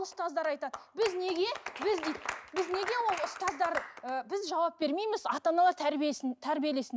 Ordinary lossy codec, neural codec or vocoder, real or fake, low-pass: none; none; real; none